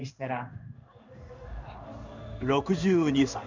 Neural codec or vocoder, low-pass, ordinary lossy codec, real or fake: codec, 16 kHz in and 24 kHz out, 1 kbps, XY-Tokenizer; 7.2 kHz; none; fake